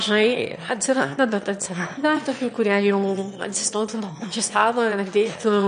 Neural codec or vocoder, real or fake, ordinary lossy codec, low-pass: autoencoder, 22.05 kHz, a latent of 192 numbers a frame, VITS, trained on one speaker; fake; MP3, 48 kbps; 9.9 kHz